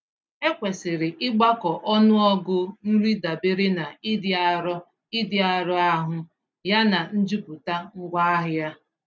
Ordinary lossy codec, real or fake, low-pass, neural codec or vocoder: none; real; none; none